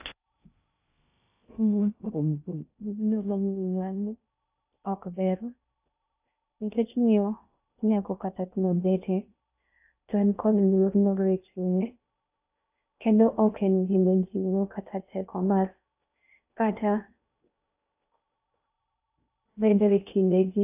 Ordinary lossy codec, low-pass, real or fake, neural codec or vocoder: AAC, 32 kbps; 3.6 kHz; fake; codec, 16 kHz in and 24 kHz out, 0.6 kbps, FocalCodec, streaming, 2048 codes